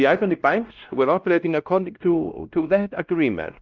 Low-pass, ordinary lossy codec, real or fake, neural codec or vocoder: 7.2 kHz; Opus, 24 kbps; fake; codec, 16 kHz, 1 kbps, X-Codec, WavLM features, trained on Multilingual LibriSpeech